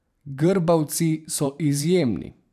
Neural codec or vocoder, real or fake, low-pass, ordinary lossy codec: vocoder, 44.1 kHz, 128 mel bands every 512 samples, BigVGAN v2; fake; 14.4 kHz; none